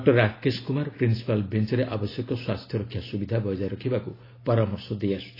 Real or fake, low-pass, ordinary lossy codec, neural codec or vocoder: real; 5.4 kHz; AAC, 24 kbps; none